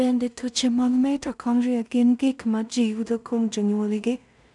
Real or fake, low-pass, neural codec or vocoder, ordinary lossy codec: fake; 10.8 kHz; codec, 16 kHz in and 24 kHz out, 0.4 kbps, LongCat-Audio-Codec, two codebook decoder; none